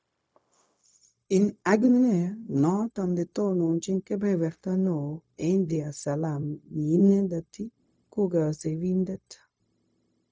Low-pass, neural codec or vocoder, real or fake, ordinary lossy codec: none; codec, 16 kHz, 0.4 kbps, LongCat-Audio-Codec; fake; none